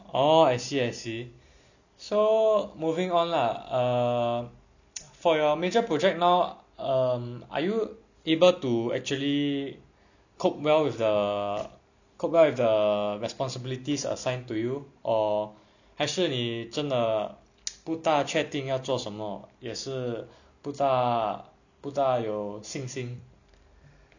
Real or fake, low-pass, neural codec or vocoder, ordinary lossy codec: real; 7.2 kHz; none; none